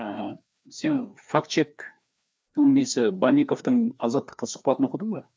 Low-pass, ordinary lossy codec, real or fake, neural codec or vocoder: none; none; fake; codec, 16 kHz, 2 kbps, FreqCodec, larger model